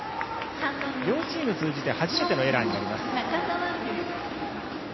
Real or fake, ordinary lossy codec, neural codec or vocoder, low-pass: real; MP3, 24 kbps; none; 7.2 kHz